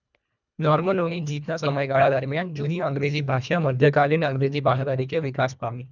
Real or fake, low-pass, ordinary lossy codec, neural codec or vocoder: fake; 7.2 kHz; none; codec, 24 kHz, 1.5 kbps, HILCodec